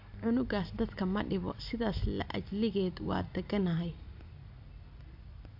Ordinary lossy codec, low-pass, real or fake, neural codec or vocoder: AAC, 48 kbps; 5.4 kHz; real; none